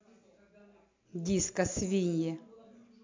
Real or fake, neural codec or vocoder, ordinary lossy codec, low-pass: real; none; MP3, 64 kbps; 7.2 kHz